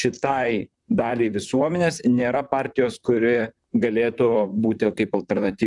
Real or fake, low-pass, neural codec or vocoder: fake; 10.8 kHz; vocoder, 44.1 kHz, 128 mel bands, Pupu-Vocoder